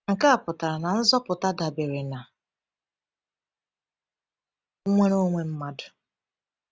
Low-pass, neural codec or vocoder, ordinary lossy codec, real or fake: none; none; none; real